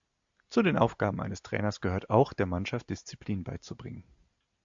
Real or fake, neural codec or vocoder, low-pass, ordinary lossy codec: real; none; 7.2 kHz; AAC, 64 kbps